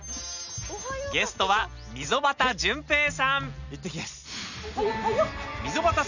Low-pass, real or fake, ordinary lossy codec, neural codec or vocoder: 7.2 kHz; real; none; none